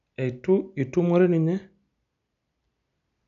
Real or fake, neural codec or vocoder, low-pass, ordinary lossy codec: real; none; 7.2 kHz; none